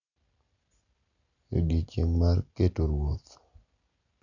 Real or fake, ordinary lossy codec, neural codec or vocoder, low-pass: real; none; none; 7.2 kHz